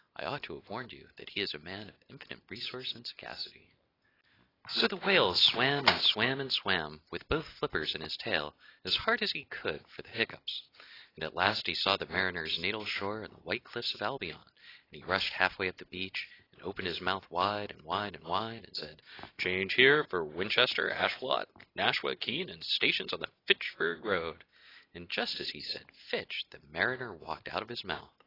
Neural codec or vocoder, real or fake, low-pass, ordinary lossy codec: none; real; 5.4 kHz; AAC, 24 kbps